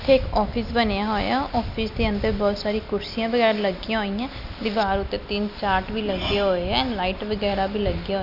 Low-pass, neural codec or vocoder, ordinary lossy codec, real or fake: 5.4 kHz; none; none; real